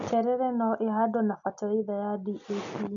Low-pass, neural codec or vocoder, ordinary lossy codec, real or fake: 7.2 kHz; none; AAC, 64 kbps; real